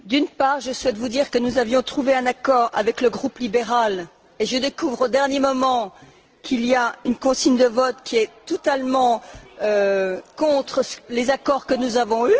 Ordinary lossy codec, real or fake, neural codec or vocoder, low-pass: Opus, 16 kbps; real; none; 7.2 kHz